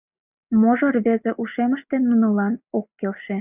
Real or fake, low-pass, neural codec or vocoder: real; 3.6 kHz; none